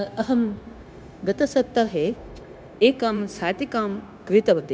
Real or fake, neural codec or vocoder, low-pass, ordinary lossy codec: fake; codec, 16 kHz, 0.9 kbps, LongCat-Audio-Codec; none; none